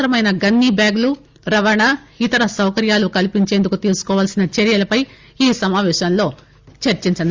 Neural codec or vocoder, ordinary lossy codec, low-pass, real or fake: none; Opus, 32 kbps; 7.2 kHz; real